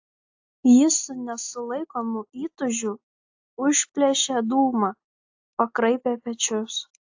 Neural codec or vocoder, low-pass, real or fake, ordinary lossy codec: none; 7.2 kHz; real; AAC, 48 kbps